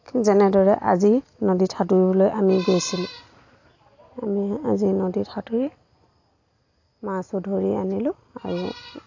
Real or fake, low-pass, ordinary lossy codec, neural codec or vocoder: real; 7.2 kHz; AAC, 48 kbps; none